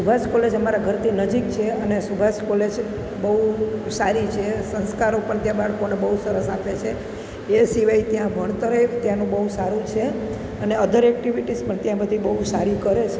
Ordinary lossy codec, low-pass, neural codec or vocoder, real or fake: none; none; none; real